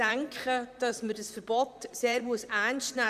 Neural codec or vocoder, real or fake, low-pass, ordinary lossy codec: vocoder, 44.1 kHz, 128 mel bands, Pupu-Vocoder; fake; 14.4 kHz; none